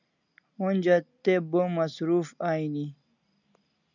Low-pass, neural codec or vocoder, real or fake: 7.2 kHz; none; real